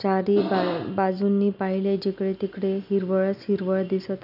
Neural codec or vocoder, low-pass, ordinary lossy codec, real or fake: none; 5.4 kHz; none; real